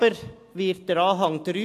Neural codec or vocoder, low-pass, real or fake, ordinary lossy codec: none; 14.4 kHz; real; AAC, 64 kbps